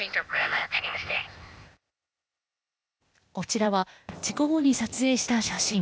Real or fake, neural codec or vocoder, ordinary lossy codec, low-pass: fake; codec, 16 kHz, 0.8 kbps, ZipCodec; none; none